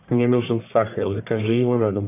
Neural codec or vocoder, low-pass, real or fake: codec, 44.1 kHz, 1.7 kbps, Pupu-Codec; 3.6 kHz; fake